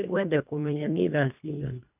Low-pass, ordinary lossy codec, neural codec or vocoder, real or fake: 3.6 kHz; none; codec, 24 kHz, 1.5 kbps, HILCodec; fake